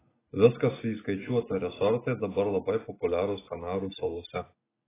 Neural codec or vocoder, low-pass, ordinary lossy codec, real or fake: none; 3.6 kHz; AAC, 16 kbps; real